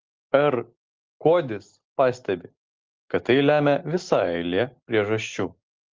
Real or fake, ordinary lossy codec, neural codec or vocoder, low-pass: real; Opus, 16 kbps; none; 7.2 kHz